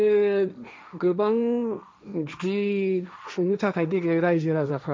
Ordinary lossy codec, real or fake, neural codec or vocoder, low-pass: none; fake; codec, 16 kHz, 1.1 kbps, Voila-Tokenizer; none